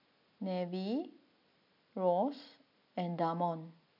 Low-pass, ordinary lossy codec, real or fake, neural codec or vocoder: 5.4 kHz; MP3, 48 kbps; real; none